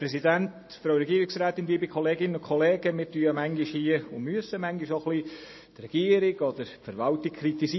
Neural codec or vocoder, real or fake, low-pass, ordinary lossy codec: none; real; 7.2 kHz; MP3, 24 kbps